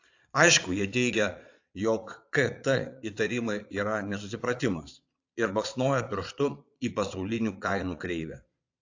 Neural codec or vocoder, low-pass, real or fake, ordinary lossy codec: vocoder, 22.05 kHz, 80 mel bands, Vocos; 7.2 kHz; fake; AAC, 48 kbps